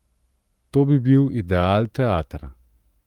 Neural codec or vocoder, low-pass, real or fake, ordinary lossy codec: codec, 44.1 kHz, 7.8 kbps, Pupu-Codec; 19.8 kHz; fake; Opus, 32 kbps